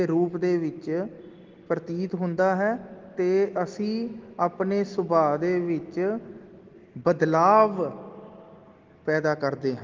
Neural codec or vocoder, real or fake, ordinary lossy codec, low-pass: none; real; Opus, 16 kbps; 7.2 kHz